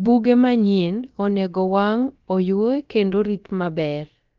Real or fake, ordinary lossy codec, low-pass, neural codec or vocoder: fake; Opus, 24 kbps; 7.2 kHz; codec, 16 kHz, about 1 kbps, DyCAST, with the encoder's durations